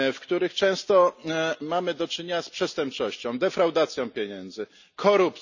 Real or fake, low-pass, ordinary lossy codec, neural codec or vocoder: real; 7.2 kHz; none; none